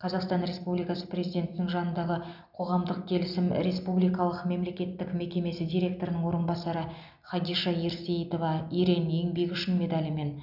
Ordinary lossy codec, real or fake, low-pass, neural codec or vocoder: none; real; 5.4 kHz; none